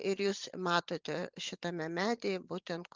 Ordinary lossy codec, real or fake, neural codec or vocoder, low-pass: Opus, 24 kbps; fake; vocoder, 44.1 kHz, 128 mel bands every 512 samples, BigVGAN v2; 7.2 kHz